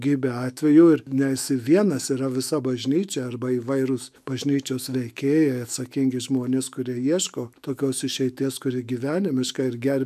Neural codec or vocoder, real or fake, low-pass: autoencoder, 48 kHz, 128 numbers a frame, DAC-VAE, trained on Japanese speech; fake; 14.4 kHz